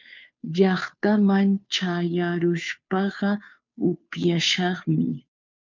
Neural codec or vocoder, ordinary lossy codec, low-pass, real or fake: codec, 16 kHz, 2 kbps, FunCodec, trained on Chinese and English, 25 frames a second; MP3, 64 kbps; 7.2 kHz; fake